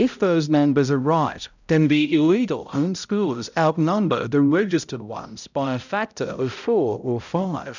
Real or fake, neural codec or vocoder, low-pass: fake; codec, 16 kHz, 0.5 kbps, X-Codec, HuBERT features, trained on balanced general audio; 7.2 kHz